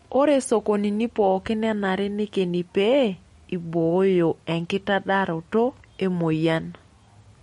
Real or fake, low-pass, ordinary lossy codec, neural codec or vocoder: real; 19.8 kHz; MP3, 48 kbps; none